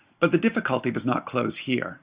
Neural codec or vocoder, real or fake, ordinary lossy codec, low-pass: none; real; Opus, 64 kbps; 3.6 kHz